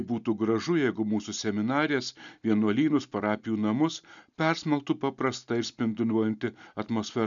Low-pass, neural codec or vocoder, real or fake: 7.2 kHz; none; real